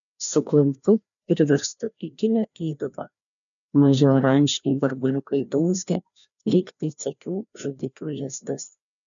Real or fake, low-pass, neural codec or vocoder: fake; 7.2 kHz; codec, 16 kHz, 1 kbps, FreqCodec, larger model